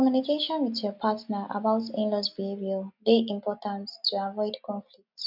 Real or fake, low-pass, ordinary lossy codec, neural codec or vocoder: real; 5.4 kHz; MP3, 48 kbps; none